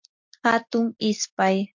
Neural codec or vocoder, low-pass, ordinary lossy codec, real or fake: none; 7.2 kHz; MP3, 48 kbps; real